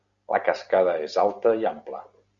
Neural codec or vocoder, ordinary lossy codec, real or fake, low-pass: none; Opus, 64 kbps; real; 7.2 kHz